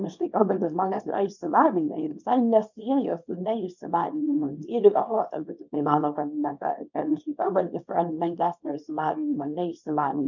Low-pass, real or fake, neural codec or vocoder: 7.2 kHz; fake; codec, 24 kHz, 0.9 kbps, WavTokenizer, small release